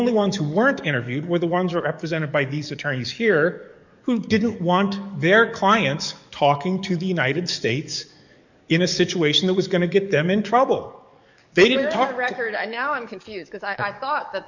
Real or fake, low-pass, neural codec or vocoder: fake; 7.2 kHz; codec, 44.1 kHz, 7.8 kbps, DAC